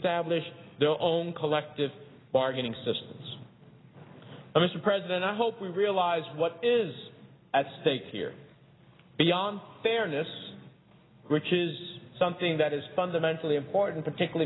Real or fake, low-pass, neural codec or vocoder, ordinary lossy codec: real; 7.2 kHz; none; AAC, 16 kbps